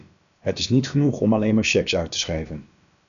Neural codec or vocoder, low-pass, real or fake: codec, 16 kHz, about 1 kbps, DyCAST, with the encoder's durations; 7.2 kHz; fake